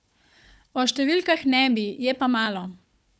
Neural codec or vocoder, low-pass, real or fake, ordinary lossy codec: codec, 16 kHz, 4 kbps, FunCodec, trained on Chinese and English, 50 frames a second; none; fake; none